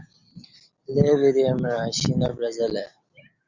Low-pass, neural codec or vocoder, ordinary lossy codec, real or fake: 7.2 kHz; none; Opus, 64 kbps; real